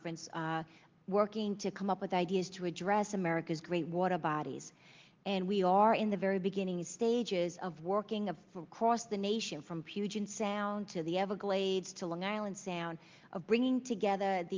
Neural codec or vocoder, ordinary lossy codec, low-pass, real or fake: none; Opus, 32 kbps; 7.2 kHz; real